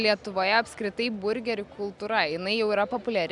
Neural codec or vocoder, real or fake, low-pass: none; real; 10.8 kHz